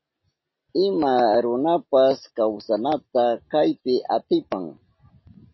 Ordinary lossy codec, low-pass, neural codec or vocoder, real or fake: MP3, 24 kbps; 7.2 kHz; none; real